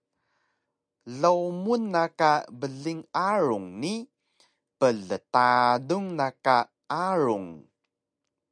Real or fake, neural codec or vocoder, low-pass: real; none; 9.9 kHz